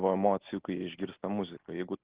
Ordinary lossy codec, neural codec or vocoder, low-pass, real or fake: Opus, 16 kbps; none; 3.6 kHz; real